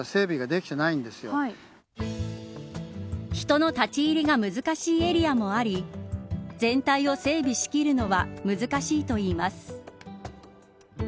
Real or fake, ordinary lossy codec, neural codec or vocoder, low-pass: real; none; none; none